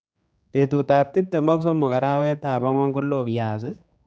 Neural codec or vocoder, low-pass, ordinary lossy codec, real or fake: codec, 16 kHz, 2 kbps, X-Codec, HuBERT features, trained on general audio; none; none; fake